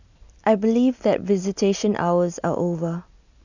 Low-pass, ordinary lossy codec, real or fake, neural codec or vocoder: 7.2 kHz; none; real; none